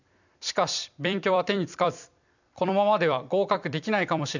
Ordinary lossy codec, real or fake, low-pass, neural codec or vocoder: none; fake; 7.2 kHz; vocoder, 44.1 kHz, 128 mel bands every 256 samples, BigVGAN v2